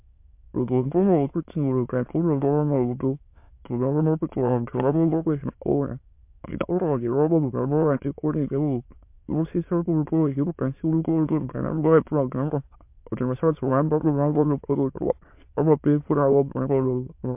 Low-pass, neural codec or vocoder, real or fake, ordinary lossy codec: 3.6 kHz; autoencoder, 22.05 kHz, a latent of 192 numbers a frame, VITS, trained on many speakers; fake; MP3, 32 kbps